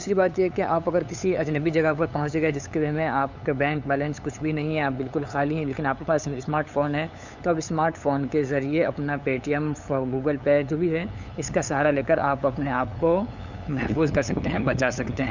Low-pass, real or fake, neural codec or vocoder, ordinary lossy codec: 7.2 kHz; fake; codec, 16 kHz, 8 kbps, FunCodec, trained on LibriTTS, 25 frames a second; none